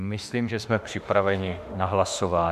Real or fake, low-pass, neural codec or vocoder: fake; 14.4 kHz; autoencoder, 48 kHz, 32 numbers a frame, DAC-VAE, trained on Japanese speech